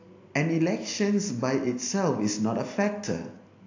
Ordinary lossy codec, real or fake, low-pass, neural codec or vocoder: AAC, 48 kbps; real; 7.2 kHz; none